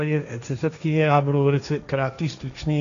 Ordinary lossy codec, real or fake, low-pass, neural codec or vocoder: AAC, 96 kbps; fake; 7.2 kHz; codec, 16 kHz, 1.1 kbps, Voila-Tokenizer